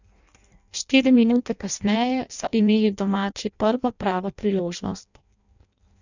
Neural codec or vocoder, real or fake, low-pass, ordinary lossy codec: codec, 16 kHz in and 24 kHz out, 0.6 kbps, FireRedTTS-2 codec; fake; 7.2 kHz; none